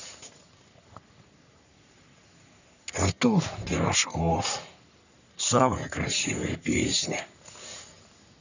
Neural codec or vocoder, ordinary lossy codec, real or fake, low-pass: codec, 44.1 kHz, 3.4 kbps, Pupu-Codec; none; fake; 7.2 kHz